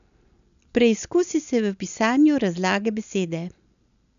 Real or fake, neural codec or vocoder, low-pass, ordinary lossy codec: real; none; 7.2 kHz; none